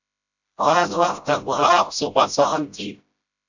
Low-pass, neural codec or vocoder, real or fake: 7.2 kHz; codec, 16 kHz, 0.5 kbps, FreqCodec, smaller model; fake